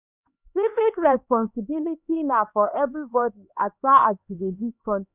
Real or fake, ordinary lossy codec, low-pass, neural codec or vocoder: fake; none; 3.6 kHz; codec, 16 kHz, 2 kbps, X-Codec, HuBERT features, trained on LibriSpeech